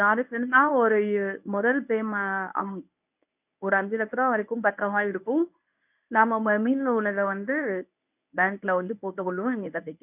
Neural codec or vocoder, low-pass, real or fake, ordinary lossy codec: codec, 24 kHz, 0.9 kbps, WavTokenizer, medium speech release version 1; 3.6 kHz; fake; none